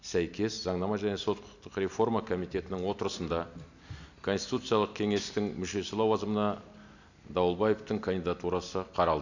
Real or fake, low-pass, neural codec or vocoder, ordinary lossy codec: real; 7.2 kHz; none; none